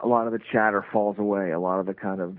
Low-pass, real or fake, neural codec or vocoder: 5.4 kHz; real; none